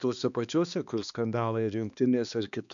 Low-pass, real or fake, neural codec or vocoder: 7.2 kHz; fake; codec, 16 kHz, 2 kbps, X-Codec, HuBERT features, trained on balanced general audio